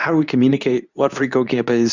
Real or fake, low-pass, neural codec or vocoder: fake; 7.2 kHz; codec, 24 kHz, 0.9 kbps, WavTokenizer, medium speech release version 2